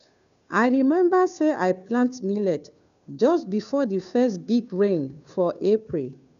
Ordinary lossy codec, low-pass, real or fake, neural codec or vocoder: none; 7.2 kHz; fake; codec, 16 kHz, 2 kbps, FunCodec, trained on Chinese and English, 25 frames a second